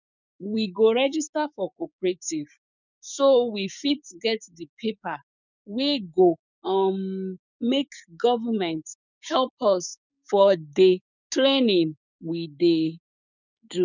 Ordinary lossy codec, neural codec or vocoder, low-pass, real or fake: none; codec, 16 kHz, 6 kbps, DAC; 7.2 kHz; fake